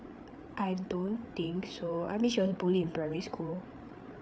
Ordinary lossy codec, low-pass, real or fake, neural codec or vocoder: none; none; fake; codec, 16 kHz, 8 kbps, FreqCodec, larger model